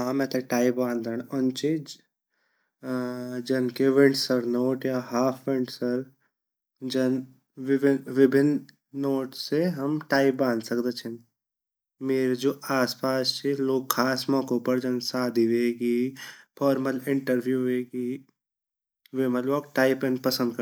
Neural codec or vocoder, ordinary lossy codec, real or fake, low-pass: none; none; real; none